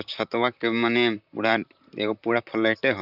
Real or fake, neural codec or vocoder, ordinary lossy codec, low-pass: fake; vocoder, 44.1 kHz, 128 mel bands, Pupu-Vocoder; none; 5.4 kHz